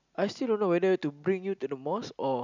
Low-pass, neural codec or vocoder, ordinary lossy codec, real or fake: 7.2 kHz; none; none; real